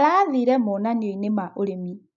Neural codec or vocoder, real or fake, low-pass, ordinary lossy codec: none; real; 7.2 kHz; none